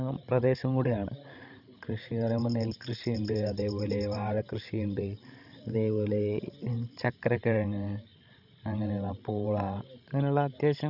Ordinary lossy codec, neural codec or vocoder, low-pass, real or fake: none; codec, 16 kHz, 16 kbps, FreqCodec, larger model; 5.4 kHz; fake